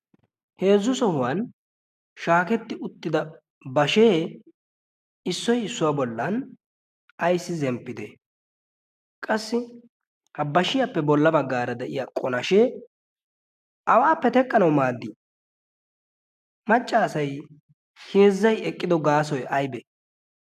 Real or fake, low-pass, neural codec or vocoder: real; 14.4 kHz; none